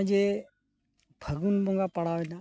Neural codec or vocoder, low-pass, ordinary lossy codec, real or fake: none; none; none; real